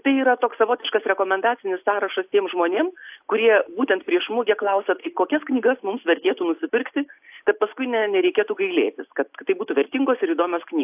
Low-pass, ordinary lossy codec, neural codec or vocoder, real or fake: 3.6 kHz; AAC, 32 kbps; none; real